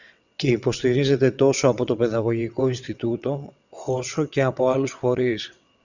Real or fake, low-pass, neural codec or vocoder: fake; 7.2 kHz; vocoder, 22.05 kHz, 80 mel bands, WaveNeXt